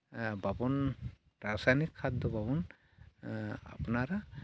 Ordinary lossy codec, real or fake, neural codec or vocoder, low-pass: none; real; none; none